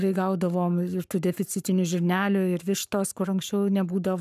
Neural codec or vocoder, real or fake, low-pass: codec, 44.1 kHz, 7.8 kbps, Pupu-Codec; fake; 14.4 kHz